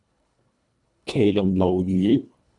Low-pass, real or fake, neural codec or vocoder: 10.8 kHz; fake; codec, 24 kHz, 1.5 kbps, HILCodec